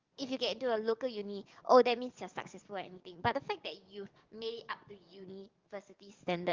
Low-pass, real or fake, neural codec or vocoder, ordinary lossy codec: 7.2 kHz; fake; codec, 44.1 kHz, 7.8 kbps, DAC; Opus, 32 kbps